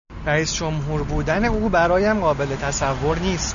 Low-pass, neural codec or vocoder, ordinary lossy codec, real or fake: 7.2 kHz; none; MP3, 96 kbps; real